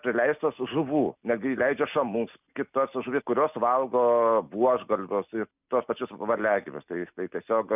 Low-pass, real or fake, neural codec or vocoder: 3.6 kHz; real; none